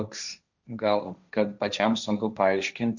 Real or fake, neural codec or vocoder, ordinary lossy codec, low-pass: fake; codec, 16 kHz, 1.1 kbps, Voila-Tokenizer; Opus, 64 kbps; 7.2 kHz